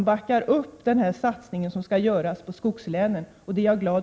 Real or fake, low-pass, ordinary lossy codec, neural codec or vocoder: real; none; none; none